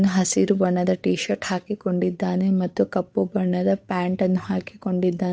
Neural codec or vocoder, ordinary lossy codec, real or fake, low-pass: codec, 16 kHz, 8 kbps, FunCodec, trained on Chinese and English, 25 frames a second; none; fake; none